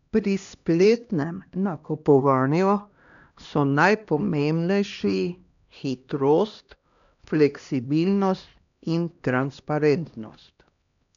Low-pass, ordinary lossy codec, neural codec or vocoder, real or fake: 7.2 kHz; none; codec, 16 kHz, 1 kbps, X-Codec, HuBERT features, trained on LibriSpeech; fake